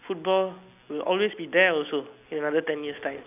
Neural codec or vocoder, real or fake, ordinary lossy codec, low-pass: none; real; none; 3.6 kHz